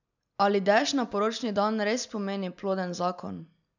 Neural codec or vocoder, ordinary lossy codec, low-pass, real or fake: none; none; 7.2 kHz; real